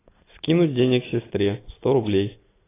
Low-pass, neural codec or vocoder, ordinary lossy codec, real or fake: 3.6 kHz; none; AAC, 16 kbps; real